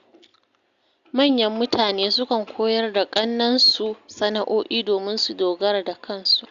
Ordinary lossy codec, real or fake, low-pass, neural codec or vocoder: Opus, 64 kbps; real; 7.2 kHz; none